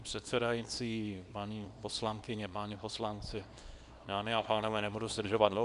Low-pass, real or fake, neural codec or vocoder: 10.8 kHz; fake; codec, 24 kHz, 0.9 kbps, WavTokenizer, small release